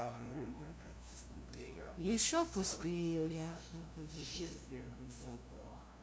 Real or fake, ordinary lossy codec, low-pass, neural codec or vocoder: fake; none; none; codec, 16 kHz, 0.5 kbps, FunCodec, trained on LibriTTS, 25 frames a second